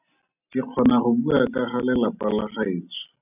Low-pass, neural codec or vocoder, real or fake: 3.6 kHz; none; real